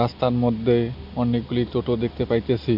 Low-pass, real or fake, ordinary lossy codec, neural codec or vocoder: 5.4 kHz; real; MP3, 48 kbps; none